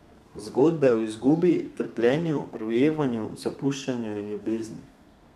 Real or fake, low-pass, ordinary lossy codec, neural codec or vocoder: fake; 14.4 kHz; none; codec, 32 kHz, 1.9 kbps, SNAC